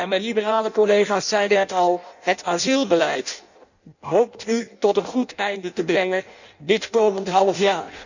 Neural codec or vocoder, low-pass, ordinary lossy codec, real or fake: codec, 16 kHz in and 24 kHz out, 0.6 kbps, FireRedTTS-2 codec; 7.2 kHz; none; fake